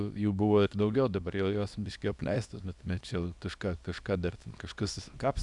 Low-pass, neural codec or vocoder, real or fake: 10.8 kHz; codec, 24 kHz, 0.9 kbps, WavTokenizer, small release; fake